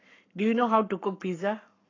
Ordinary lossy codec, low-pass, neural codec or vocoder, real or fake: AAC, 32 kbps; 7.2 kHz; codec, 44.1 kHz, 7.8 kbps, Pupu-Codec; fake